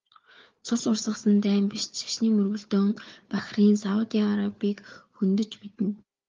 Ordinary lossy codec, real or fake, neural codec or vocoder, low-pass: Opus, 32 kbps; fake; codec, 16 kHz, 4 kbps, FunCodec, trained on Chinese and English, 50 frames a second; 7.2 kHz